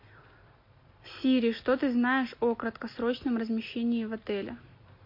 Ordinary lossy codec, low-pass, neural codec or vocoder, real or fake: MP3, 32 kbps; 5.4 kHz; none; real